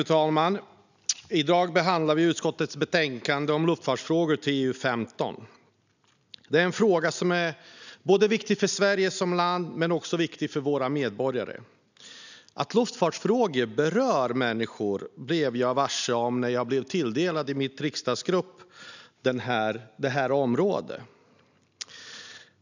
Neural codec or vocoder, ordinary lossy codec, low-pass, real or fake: none; none; 7.2 kHz; real